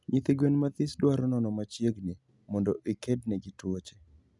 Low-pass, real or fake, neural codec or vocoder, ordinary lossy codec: 10.8 kHz; real; none; none